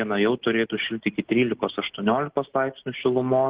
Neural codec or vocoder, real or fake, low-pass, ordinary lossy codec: none; real; 3.6 kHz; Opus, 16 kbps